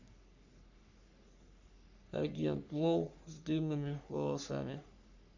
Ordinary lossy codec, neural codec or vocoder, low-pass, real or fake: none; codec, 44.1 kHz, 3.4 kbps, Pupu-Codec; 7.2 kHz; fake